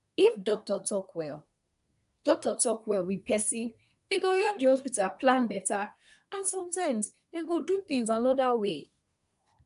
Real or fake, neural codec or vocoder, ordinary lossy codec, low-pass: fake; codec, 24 kHz, 1 kbps, SNAC; AAC, 96 kbps; 10.8 kHz